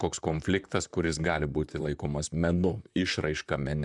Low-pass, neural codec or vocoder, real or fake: 10.8 kHz; vocoder, 44.1 kHz, 128 mel bands, Pupu-Vocoder; fake